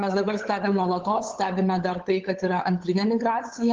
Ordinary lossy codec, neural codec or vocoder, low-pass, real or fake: Opus, 16 kbps; codec, 16 kHz, 8 kbps, FunCodec, trained on LibriTTS, 25 frames a second; 7.2 kHz; fake